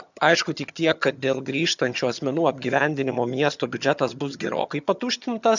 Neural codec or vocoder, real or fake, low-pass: vocoder, 22.05 kHz, 80 mel bands, HiFi-GAN; fake; 7.2 kHz